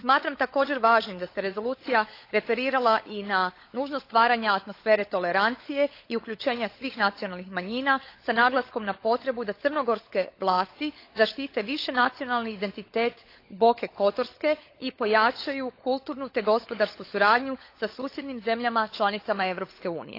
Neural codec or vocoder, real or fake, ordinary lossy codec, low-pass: codec, 16 kHz, 16 kbps, FunCodec, trained on LibriTTS, 50 frames a second; fake; AAC, 32 kbps; 5.4 kHz